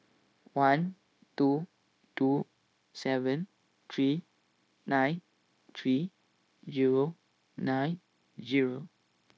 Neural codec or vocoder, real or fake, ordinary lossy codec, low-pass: codec, 16 kHz, 0.9 kbps, LongCat-Audio-Codec; fake; none; none